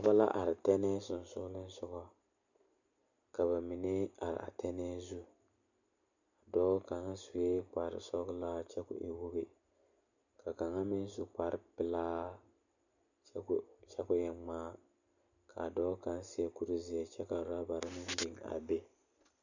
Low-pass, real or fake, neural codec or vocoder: 7.2 kHz; real; none